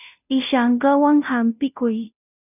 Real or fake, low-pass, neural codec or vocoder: fake; 3.6 kHz; codec, 16 kHz, 0.5 kbps, FunCodec, trained on Chinese and English, 25 frames a second